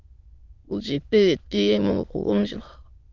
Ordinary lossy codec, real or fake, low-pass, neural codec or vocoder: Opus, 32 kbps; fake; 7.2 kHz; autoencoder, 22.05 kHz, a latent of 192 numbers a frame, VITS, trained on many speakers